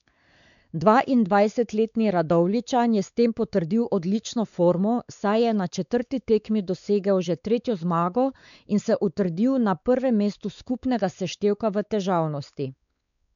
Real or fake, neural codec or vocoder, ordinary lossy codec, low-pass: fake; codec, 16 kHz, 4 kbps, X-Codec, WavLM features, trained on Multilingual LibriSpeech; none; 7.2 kHz